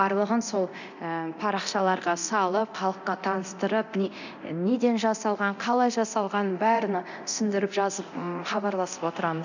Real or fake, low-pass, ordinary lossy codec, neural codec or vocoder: fake; 7.2 kHz; none; codec, 24 kHz, 0.9 kbps, DualCodec